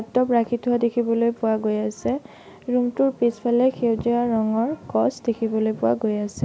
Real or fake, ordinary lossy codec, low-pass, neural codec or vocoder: real; none; none; none